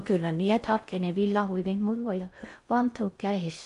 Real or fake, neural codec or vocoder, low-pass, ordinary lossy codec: fake; codec, 16 kHz in and 24 kHz out, 0.6 kbps, FocalCodec, streaming, 4096 codes; 10.8 kHz; MP3, 64 kbps